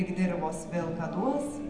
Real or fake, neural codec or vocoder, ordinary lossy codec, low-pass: real; none; AAC, 64 kbps; 9.9 kHz